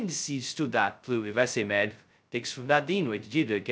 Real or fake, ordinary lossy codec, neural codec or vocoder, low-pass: fake; none; codec, 16 kHz, 0.2 kbps, FocalCodec; none